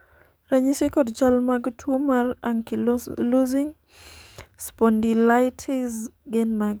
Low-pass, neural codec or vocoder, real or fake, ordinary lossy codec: none; codec, 44.1 kHz, 7.8 kbps, DAC; fake; none